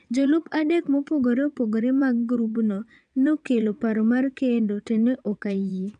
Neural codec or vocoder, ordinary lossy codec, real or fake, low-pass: vocoder, 22.05 kHz, 80 mel bands, WaveNeXt; none; fake; 9.9 kHz